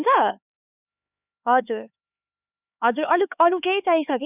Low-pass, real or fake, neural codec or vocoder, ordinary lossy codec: 3.6 kHz; fake; codec, 16 kHz, 4 kbps, X-Codec, HuBERT features, trained on LibriSpeech; none